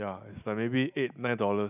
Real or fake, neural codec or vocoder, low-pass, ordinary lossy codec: real; none; 3.6 kHz; none